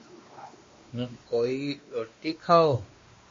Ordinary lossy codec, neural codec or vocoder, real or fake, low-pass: MP3, 32 kbps; codec, 16 kHz, 2 kbps, X-Codec, WavLM features, trained on Multilingual LibriSpeech; fake; 7.2 kHz